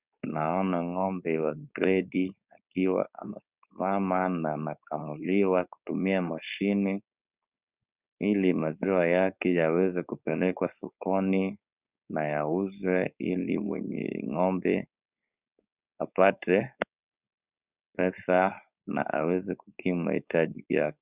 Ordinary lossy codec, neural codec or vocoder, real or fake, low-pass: Opus, 64 kbps; codec, 16 kHz, 4.8 kbps, FACodec; fake; 3.6 kHz